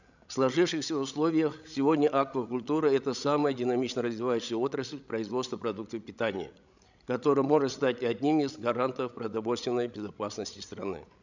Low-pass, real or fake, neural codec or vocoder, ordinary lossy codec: 7.2 kHz; fake; codec, 16 kHz, 16 kbps, FreqCodec, larger model; none